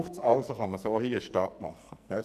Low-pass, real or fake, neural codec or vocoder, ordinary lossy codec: 14.4 kHz; fake; codec, 44.1 kHz, 2.6 kbps, SNAC; none